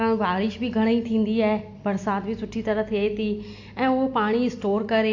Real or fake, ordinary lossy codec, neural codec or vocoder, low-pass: real; AAC, 48 kbps; none; 7.2 kHz